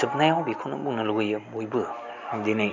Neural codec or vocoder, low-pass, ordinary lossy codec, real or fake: none; 7.2 kHz; none; real